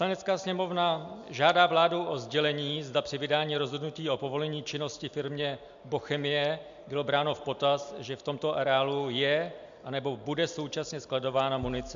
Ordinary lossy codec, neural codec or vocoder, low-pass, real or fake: MP3, 64 kbps; none; 7.2 kHz; real